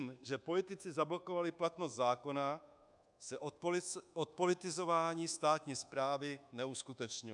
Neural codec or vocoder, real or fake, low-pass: codec, 24 kHz, 1.2 kbps, DualCodec; fake; 10.8 kHz